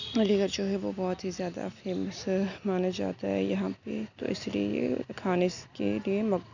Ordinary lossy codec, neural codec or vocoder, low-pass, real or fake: none; none; 7.2 kHz; real